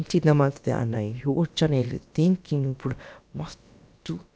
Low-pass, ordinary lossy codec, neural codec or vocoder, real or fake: none; none; codec, 16 kHz, about 1 kbps, DyCAST, with the encoder's durations; fake